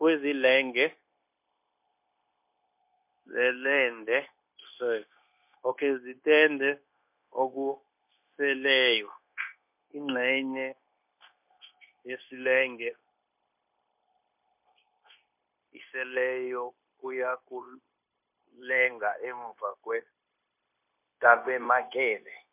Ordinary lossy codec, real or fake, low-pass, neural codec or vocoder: MP3, 32 kbps; fake; 3.6 kHz; codec, 16 kHz, 0.9 kbps, LongCat-Audio-Codec